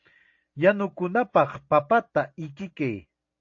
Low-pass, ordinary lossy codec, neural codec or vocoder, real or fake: 7.2 kHz; AAC, 48 kbps; none; real